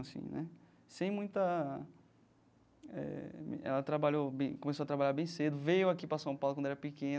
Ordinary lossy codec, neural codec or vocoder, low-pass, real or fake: none; none; none; real